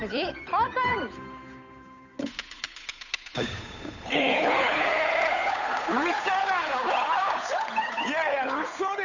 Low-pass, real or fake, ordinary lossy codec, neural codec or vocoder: 7.2 kHz; fake; none; codec, 16 kHz, 8 kbps, FunCodec, trained on Chinese and English, 25 frames a second